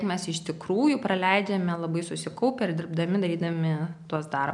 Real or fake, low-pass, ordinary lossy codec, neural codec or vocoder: real; 10.8 kHz; MP3, 96 kbps; none